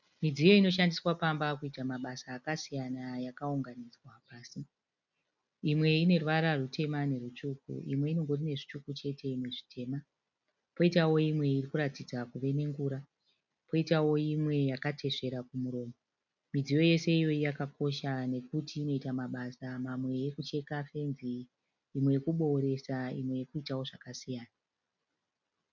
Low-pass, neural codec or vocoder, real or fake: 7.2 kHz; none; real